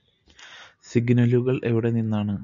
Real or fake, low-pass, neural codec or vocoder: real; 7.2 kHz; none